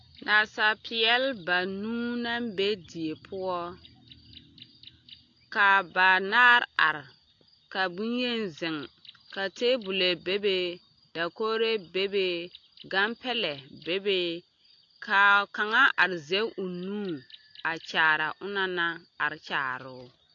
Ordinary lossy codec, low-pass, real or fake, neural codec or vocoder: AAC, 48 kbps; 7.2 kHz; real; none